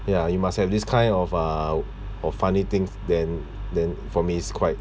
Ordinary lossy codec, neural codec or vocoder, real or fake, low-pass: none; none; real; none